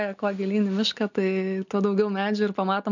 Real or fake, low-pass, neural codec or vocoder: real; 7.2 kHz; none